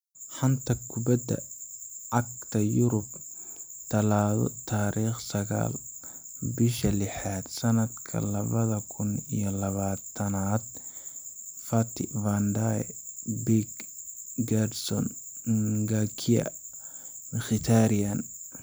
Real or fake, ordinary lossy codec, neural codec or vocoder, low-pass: fake; none; vocoder, 44.1 kHz, 128 mel bands every 256 samples, BigVGAN v2; none